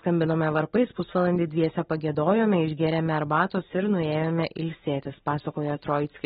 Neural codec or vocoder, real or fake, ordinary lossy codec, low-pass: none; real; AAC, 16 kbps; 19.8 kHz